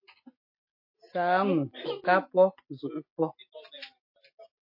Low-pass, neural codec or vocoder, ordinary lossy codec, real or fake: 5.4 kHz; none; MP3, 32 kbps; real